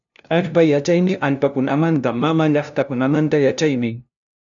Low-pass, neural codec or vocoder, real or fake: 7.2 kHz; codec, 16 kHz, 0.5 kbps, FunCodec, trained on LibriTTS, 25 frames a second; fake